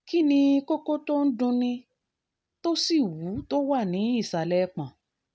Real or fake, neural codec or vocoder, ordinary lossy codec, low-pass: real; none; none; none